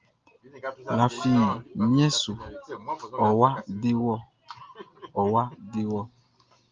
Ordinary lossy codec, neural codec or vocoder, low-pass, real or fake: Opus, 24 kbps; none; 7.2 kHz; real